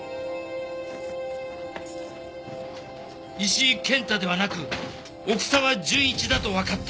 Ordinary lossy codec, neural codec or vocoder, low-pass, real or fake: none; none; none; real